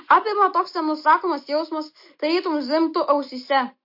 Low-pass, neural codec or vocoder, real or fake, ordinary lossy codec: 5.4 kHz; none; real; MP3, 32 kbps